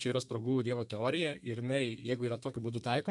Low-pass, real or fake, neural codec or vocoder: 10.8 kHz; fake; codec, 32 kHz, 1.9 kbps, SNAC